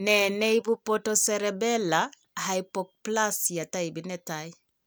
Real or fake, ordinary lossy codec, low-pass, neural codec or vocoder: real; none; none; none